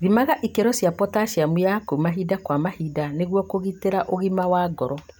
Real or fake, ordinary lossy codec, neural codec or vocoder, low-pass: real; none; none; none